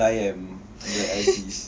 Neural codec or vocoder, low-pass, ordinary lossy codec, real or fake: none; none; none; real